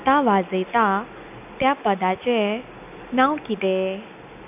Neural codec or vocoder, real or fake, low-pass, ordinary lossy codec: none; real; 3.6 kHz; none